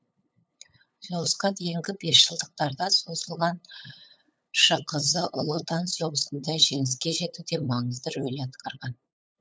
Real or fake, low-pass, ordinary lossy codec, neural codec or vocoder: fake; none; none; codec, 16 kHz, 8 kbps, FunCodec, trained on LibriTTS, 25 frames a second